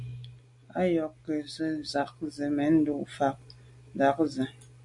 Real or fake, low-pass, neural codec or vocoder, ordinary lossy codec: real; 10.8 kHz; none; AAC, 64 kbps